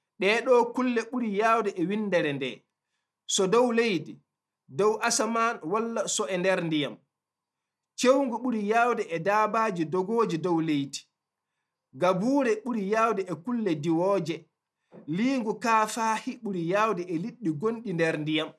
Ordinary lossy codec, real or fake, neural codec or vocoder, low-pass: none; real; none; none